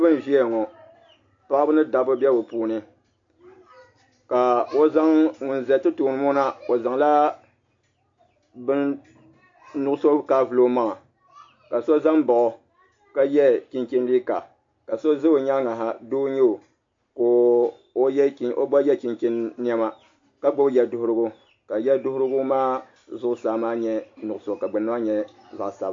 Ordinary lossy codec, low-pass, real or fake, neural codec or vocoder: AAC, 48 kbps; 7.2 kHz; real; none